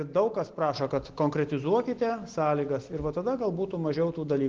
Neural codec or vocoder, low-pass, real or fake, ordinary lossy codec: none; 7.2 kHz; real; Opus, 16 kbps